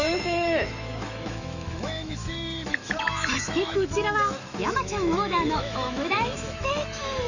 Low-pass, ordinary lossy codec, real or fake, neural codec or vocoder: 7.2 kHz; none; fake; autoencoder, 48 kHz, 128 numbers a frame, DAC-VAE, trained on Japanese speech